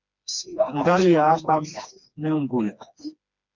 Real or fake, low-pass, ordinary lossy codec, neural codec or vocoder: fake; 7.2 kHz; MP3, 64 kbps; codec, 16 kHz, 2 kbps, FreqCodec, smaller model